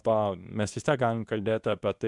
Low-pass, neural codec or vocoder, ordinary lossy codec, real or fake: 10.8 kHz; codec, 24 kHz, 0.9 kbps, WavTokenizer, medium speech release version 2; MP3, 96 kbps; fake